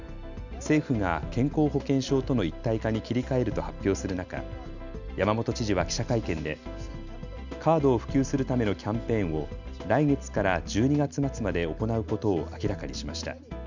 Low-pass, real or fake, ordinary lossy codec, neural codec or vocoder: 7.2 kHz; real; none; none